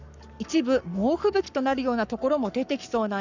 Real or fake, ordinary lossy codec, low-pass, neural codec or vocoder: fake; none; 7.2 kHz; codec, 44.1 kHz, 7.8 kbps, Pupu-Codec